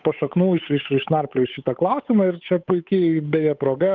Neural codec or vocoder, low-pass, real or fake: codec, 16 kHz, 8 kbps, FunCodec, trained on Chinese and English, 25 frames a second; 7.2 kHz; fake